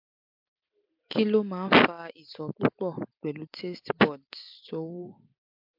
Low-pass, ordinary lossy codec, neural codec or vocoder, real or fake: 5.4 kHz; MP3, 48 kbps; none; real